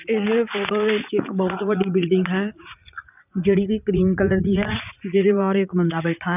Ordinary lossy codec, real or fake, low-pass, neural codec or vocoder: none; fake; 3.6 kHz; vocoder, 44.1 kHz, 80 mel bands, Vocos